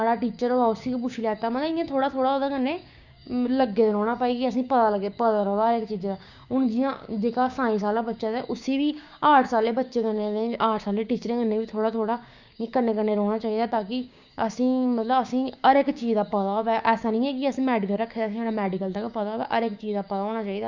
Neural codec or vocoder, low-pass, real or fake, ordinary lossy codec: codec, 16 kHz, 6 kbps, DAC; 7.2 kHz; fake; Opus, 64 kbps